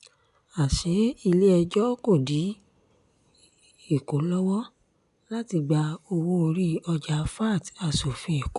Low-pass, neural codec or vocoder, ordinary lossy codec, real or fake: 10.8 kHz; none; none; real